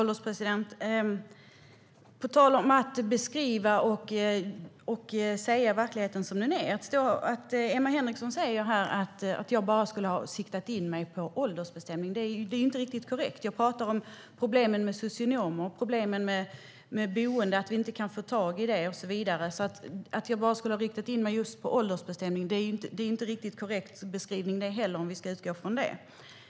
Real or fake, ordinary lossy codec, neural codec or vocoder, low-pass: real; none; none; none